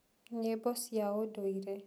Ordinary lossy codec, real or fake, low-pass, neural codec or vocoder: none; real; none; none